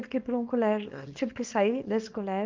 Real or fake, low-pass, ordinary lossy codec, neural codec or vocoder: fake; 7.2 kHz; Opus, 24 kbps; codec, 24 kHz, 0.9 kbps, WavTokenizer, small release